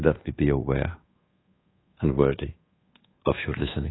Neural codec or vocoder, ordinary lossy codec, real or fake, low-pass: codec, 24 kHz, 1.2 kbps, DualCodec; AAC, 16 kbps; fake; 7.2 kHz